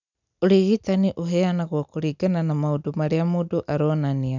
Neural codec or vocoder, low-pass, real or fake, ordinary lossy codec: none; 7.2 kHz; real; none